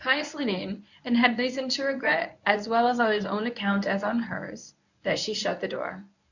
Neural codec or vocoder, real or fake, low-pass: codec, 24 kHz, 0.9 kbps, WavTokenizer, medium speech release version 1; fake; 7.2 kHz